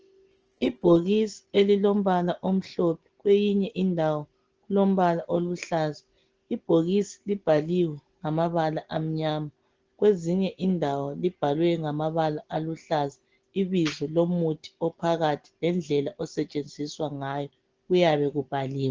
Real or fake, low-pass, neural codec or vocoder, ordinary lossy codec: real; 7.2 kHz; none; Opus, 16 kbps